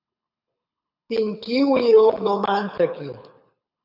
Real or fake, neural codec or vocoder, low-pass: fake; codec, 24 kHz, 6 kbps, HILCodec; 5.4 kHz